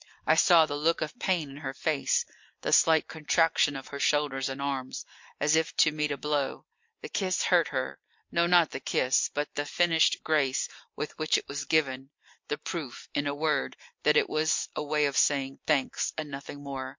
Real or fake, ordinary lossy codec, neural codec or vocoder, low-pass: real; MP3, 48 kbps; none; 7.2 kHz